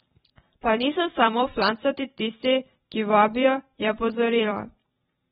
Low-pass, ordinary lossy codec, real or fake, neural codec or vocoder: 19.8 kHz; AAC, 16 kbps; fake; vocoder, 44.1 kHz, 128 mel bands every 256 samples, BigVGAN v2